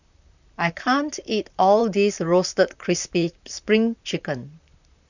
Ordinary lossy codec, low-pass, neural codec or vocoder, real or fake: none; 7.2 kHz; vocoder, 44.1 kHz, 128 mel bands, Pupu-Vocoder; fake